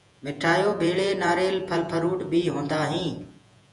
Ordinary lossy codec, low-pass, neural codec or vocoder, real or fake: MP3, 96 kbps; 10.8 kHz; vocoder, 48 kHz, 128 mel bands, Vocos; fake